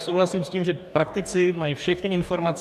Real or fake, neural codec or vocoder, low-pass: fake; codec, 44.1 kHz, 2.6 kbps, DAC; 14.4 kHz